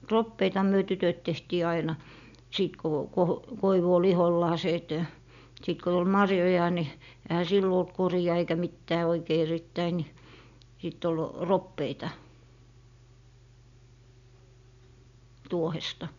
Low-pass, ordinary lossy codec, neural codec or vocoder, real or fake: 7.2 kHz; MP3, 96 kbps; none; real